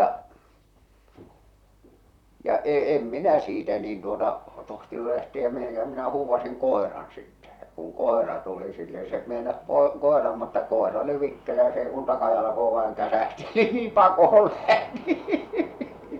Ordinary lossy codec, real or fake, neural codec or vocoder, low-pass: none; fake; vocoder, 44.1 kHz, 128 mel bands, Pupu-Vocoder; 19.8 kHz